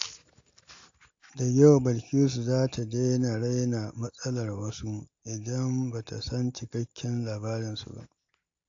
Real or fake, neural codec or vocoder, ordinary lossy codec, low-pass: real; none; AAC, 48 kbps; 7.2 kHz